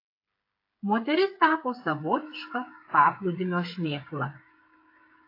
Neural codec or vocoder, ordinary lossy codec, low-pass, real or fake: codec, 16 kHz, 8 kbps, FreqCodec, smaller model; AAC, 32 kbps; 5.4 kHz; fake